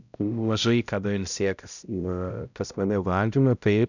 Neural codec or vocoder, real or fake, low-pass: codec, 16 kHz, 0.5 kbps, X-Codec, HuBERT features, trained on balanced general audio; fake; 7.2 kHz